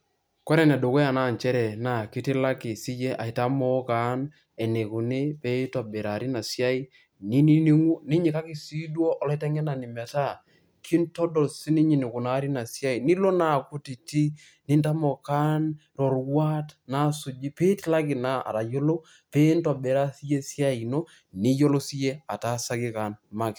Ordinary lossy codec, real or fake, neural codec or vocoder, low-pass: none; real; none; none